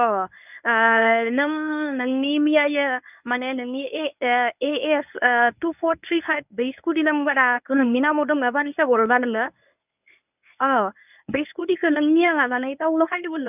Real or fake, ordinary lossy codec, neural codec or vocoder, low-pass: fake; none; codec, 24 kHz, 0.9 kbps, WavTokenizer, medium speech release version 1; 3.6 kHz